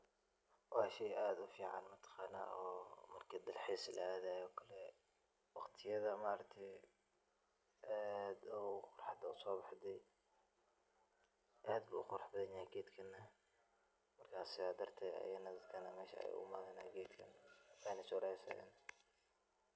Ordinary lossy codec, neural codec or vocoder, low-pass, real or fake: none; none; none; real